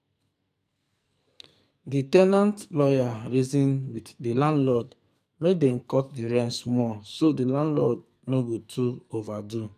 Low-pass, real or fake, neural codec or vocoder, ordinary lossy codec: 14.4 kHz; fake; codec, 44.1 kHz, 2.6 kbps, SNAC; none